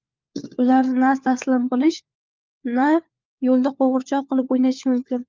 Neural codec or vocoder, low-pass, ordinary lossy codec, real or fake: codec, 16 kHz, 4 kbps, FunCodec, trained on LibriTTS, 50 frames a second; 7.2 kHz; Opus, 24 kbps; fake